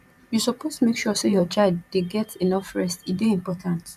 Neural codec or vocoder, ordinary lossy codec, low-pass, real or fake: vocoder, 44.1 kHz, 128 mel bands every 512 samples, BigVGAN v2; none; 14.4 kHz; fake